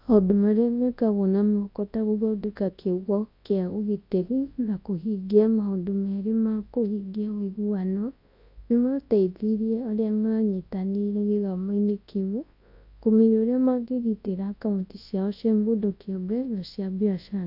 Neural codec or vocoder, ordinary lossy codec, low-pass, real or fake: codec, 24 kHz, 0.9 kbps, WavTokenizer, large speech release; AAC, 32 kbps; 5.4 kHz; fake